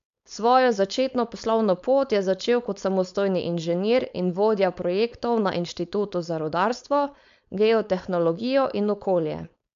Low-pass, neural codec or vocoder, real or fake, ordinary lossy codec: 7.2 kHz; codec, 16 kHz, 4.8 kbps, FACodec; fake; MP3, 64 kbps